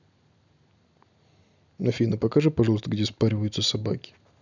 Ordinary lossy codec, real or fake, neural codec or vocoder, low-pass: none; real; none; 7.2 kHz